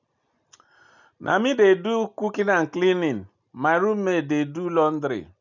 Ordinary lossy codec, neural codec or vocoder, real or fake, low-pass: none; none; real; 7.2 kHz